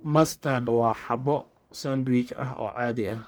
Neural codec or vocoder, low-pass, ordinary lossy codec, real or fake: codec, 44.1 kHz, 1.7 kbps, Pupu-Codec; none; none; fake